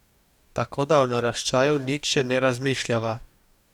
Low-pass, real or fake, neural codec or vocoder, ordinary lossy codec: 19.8 kHz; fake; codec, 44.1 kHz, 2.6 kbps, DAC; none